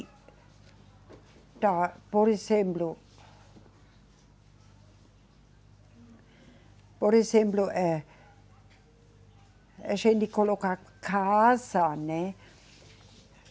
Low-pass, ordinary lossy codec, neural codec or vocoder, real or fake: none; none; none; real